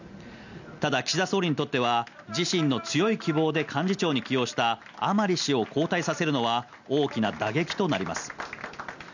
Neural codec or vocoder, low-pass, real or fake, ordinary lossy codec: none; 7.2 kHz; real; none